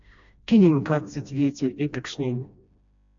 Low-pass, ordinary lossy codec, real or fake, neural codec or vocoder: 7.2 kHz; AAC, 64 kbps; fake; codec, 16 kHz, 1 kbps, FreqCodec, smaller model